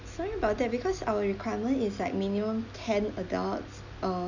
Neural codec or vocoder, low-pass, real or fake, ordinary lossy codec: none; 7.2 kHz; real; none